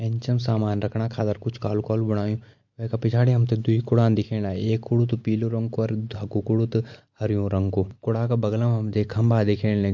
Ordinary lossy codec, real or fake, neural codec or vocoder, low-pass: MP3, 48 kbps; real; none; 7.2 kHz